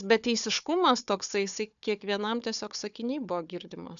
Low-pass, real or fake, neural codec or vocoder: 7.2 kHz; fake; codec, 16 kHz, 4 kbps, FunCodec, trained on Chinese and English, 50 frames a second